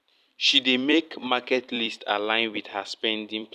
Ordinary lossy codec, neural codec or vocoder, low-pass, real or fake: none; vocoder, 44.1 kHz, 128 mel bands every 256 samples, BigVGAN v2; 14.4 kHz; fake